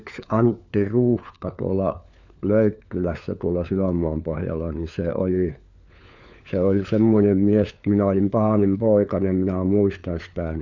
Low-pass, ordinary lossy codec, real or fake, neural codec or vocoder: 7.2 kHz; none; fake; codec, 16 kHz, 4 kbps, FunCodec, trained on LibriTTS, 50 frames a second